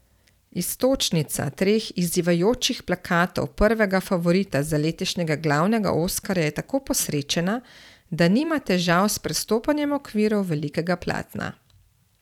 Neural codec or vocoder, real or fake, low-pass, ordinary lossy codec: vocoder, 44.1 kHz, 128 mel bands every 512 samples, BigVGAN v2; fake; 19.8 kHz; none